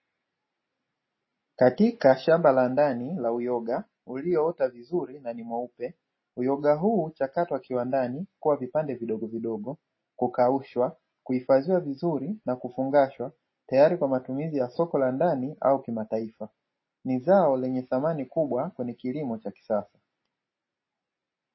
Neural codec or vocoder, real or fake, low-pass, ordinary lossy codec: none; real; 7.2 kHz; MP3, 24 kbps